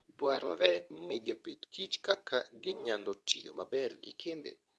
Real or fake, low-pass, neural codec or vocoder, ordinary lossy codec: fake; none; codec, 24 kHz, 0.9 kbps, WavTokenizer, medium speech release version 2; none